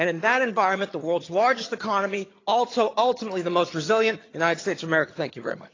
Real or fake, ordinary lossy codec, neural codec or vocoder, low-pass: fake; AAC, 32 kbps; vocoder, 22.05 kHz, 80 mel bands, HiFi-GAN; 7.2 kHz